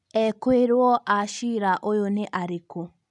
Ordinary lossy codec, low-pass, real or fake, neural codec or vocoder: none; 10.8 kHz; real; none